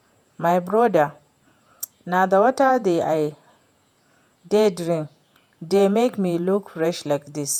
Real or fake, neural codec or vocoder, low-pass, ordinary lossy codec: fake; vocoder, 48 kHz, 128 mel bands, Vocos; 19.8 kHz; none